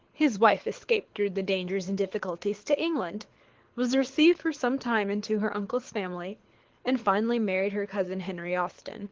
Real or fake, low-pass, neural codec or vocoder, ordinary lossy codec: fake; 7.2 kHz; codec, 24 kHz, 6 kbps, HILCodec; Opus, 16 kbps